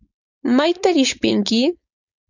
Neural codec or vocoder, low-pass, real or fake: codec, 16 kHz, 4.8 kbps, FACodec; 7.2 kHz; fake